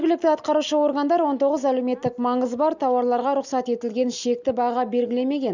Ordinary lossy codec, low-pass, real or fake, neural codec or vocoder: none; 7.2 kHz; real; none